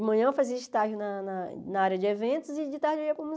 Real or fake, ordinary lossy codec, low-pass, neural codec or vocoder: real; none; none; none